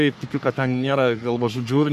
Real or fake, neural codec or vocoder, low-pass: fake; codec, 44.1 kHz, 3.4 kbps, Pupu-Codec; 14.4 kHz